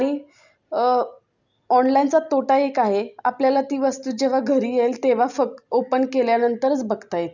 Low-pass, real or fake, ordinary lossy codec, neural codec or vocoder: 7.2 kHz; real; none; none